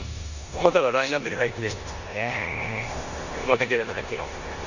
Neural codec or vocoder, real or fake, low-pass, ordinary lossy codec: codec, 16 kHz in and 24 kHz out, 0.9 kbps, LongCat-Audio-Codec, four codebook decoder; fake; 7.2 kHz; none